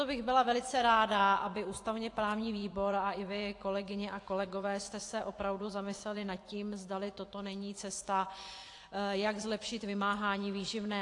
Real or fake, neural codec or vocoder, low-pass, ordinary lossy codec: fake; vocoder, 24 kHz, 100 mel bands, Vocos; 10.8 kHz; AAC, 48 kbps